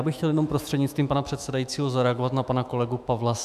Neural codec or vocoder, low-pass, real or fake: autoencoder, 48 kHz, 128 numbers a frame, DAC-VAE, trained on Japanese speech; 14.4 kHz; fake